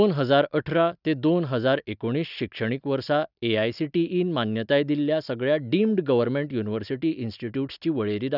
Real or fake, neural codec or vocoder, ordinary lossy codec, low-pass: real; none; none; 5.4 kHz